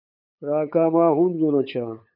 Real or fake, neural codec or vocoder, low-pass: fake; codec, 16 kHz, 8 kbps, FunCodec, trained on LibriTTS, 25 frames a second; 5.4 kHz